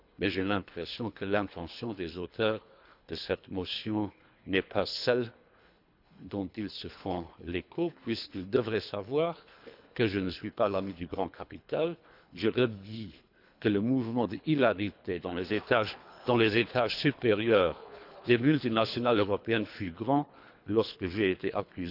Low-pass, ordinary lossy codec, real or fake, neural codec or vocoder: 5.4 kHz; none; fake; codec, 24 kHz, 3 kbps, HILCodec